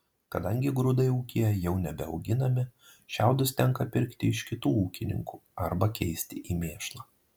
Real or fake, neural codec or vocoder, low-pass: real; none; 19.8 kHz